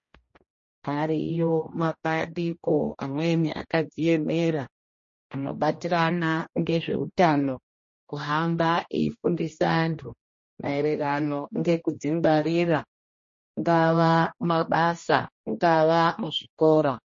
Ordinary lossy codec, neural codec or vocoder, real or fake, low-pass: MP3, 32 kbps; codec, 16 kHz, 1 kbps, X-Codec, HuBERT features, trained on general audio; fake; 7.2 kHz